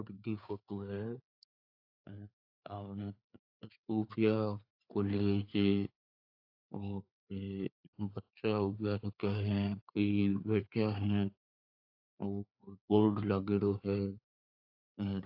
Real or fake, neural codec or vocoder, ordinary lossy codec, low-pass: fake; codec, 16 kHz, 4 kbps, FreqCodec, larger model; AAC, 48 kbps; 5.4 kHz